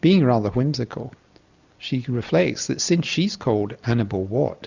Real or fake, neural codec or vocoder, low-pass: real; none; 7.2 kHz